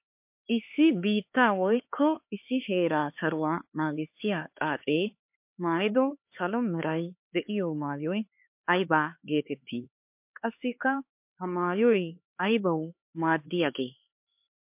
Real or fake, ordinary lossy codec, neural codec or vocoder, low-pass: fake; MP3, 32 kbps; codec, 16 kHz, 4 kbps, X-Codec, HuBERT features, trained on LibriSpeech; 3.6 kHz